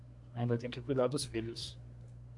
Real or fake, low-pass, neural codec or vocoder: fake; 10.8 kHz; codec, 24 kHz, 1 kbps, SNAC